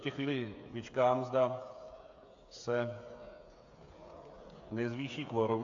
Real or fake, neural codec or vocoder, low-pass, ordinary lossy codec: fake; codec, 16 kHz, 8 kbps, FreqCodec, smaller model; 7.2 kHz; AAC, 48 kbps